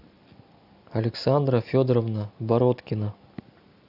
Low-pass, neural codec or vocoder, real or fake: 5.4 kHz; none; real